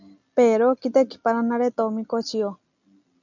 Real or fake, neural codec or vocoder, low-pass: real; none; 7.2 kHz